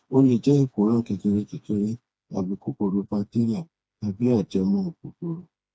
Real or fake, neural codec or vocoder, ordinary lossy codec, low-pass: fake; codec, 16 kHz, 2 kbps, FreqCodec, smaller model; none; none